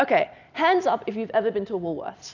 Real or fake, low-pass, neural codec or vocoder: real; 7.2 kHz; none